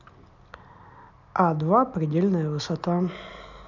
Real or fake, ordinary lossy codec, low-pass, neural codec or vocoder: real; none; 7.2 kHz; none